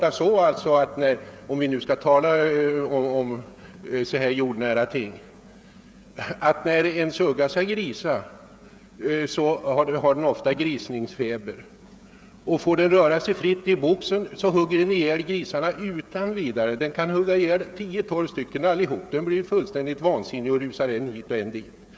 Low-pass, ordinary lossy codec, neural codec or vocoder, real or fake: none; none; codec, 16 kHz, 16 kbps, FreqCodec, smaller model; fake